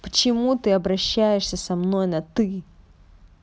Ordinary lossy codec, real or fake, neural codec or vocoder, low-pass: none; real; none; none